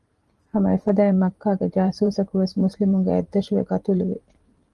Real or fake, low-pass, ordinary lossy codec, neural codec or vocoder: fake; 10.8 kHz; Opus, 24 kbps; vocoder, 44.1 kHz, 128 mel bands every 512 samples, BigVGAN v2